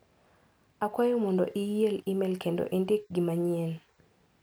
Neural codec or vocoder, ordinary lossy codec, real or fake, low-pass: none; none; real; none